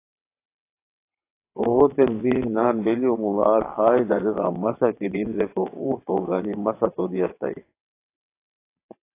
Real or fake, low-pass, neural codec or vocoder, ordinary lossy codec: fake; 3.6 kHz; vocoder, 22.05 kHz, 80 mel bands, WaveNeXt; AAC, 24 kbps